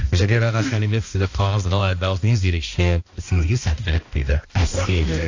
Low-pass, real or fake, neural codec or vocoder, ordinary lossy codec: 7.2 kHz; fake; codec, 16 kHz, 1 kbps, X-Codec, HuBERT features, trained on balanced general audio; AAC, 48 kbps